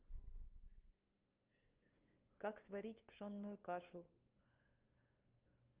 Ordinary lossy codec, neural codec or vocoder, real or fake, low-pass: Opus, 24 kbps; codec, 16 kHz, 2 kbps, FunCodec, trained on LibriTTS, 25 frames a second; fake; 3.6 kHz